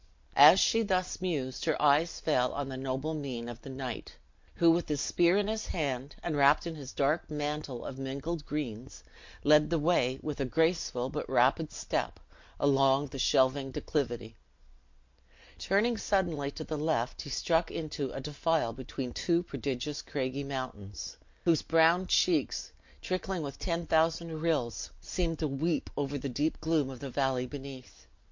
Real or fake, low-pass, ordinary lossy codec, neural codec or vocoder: real; 7.2 kHz; MP3, 48 kbps; none